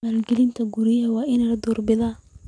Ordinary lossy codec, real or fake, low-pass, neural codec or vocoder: none; real; 9.9 kHz; none